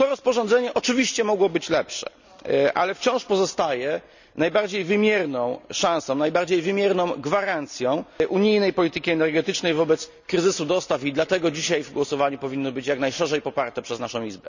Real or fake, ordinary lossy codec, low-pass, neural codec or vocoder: real; none; 7.2 kHz; none